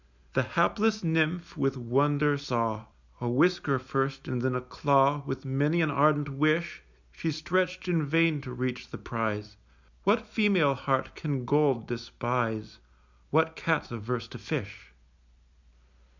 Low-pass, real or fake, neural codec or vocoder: 7.2 kHz; real; none